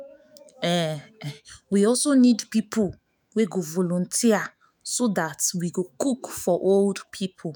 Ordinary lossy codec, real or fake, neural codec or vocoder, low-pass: none; fake; autoencoder, 48 kHz, 128 numbers a frame, DAC-VAE, trained on Japanese speech; none